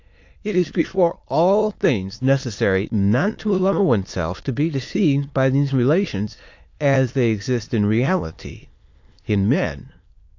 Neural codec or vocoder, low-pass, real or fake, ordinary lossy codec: autoencoder, 22.05 kHz, a latent of 192 numbers a frame, VITS, trained on many speakers; 7.2 kHz; fake; AAC, 48 kbps